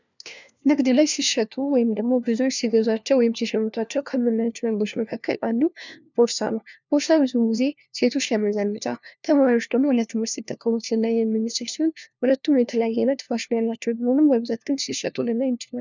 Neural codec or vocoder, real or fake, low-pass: codec, 16 kHz, 1 kbps, FunCodec, trained on LibriTTS, 50 frames a second; fake; 7.2 kHz